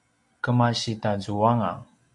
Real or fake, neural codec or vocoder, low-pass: real; none; 10.8 kHz